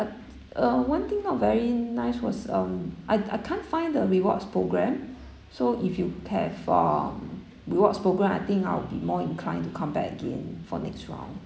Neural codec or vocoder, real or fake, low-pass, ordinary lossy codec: none; real; none; none